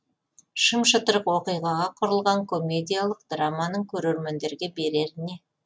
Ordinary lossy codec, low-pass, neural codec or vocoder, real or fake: none; none; none; real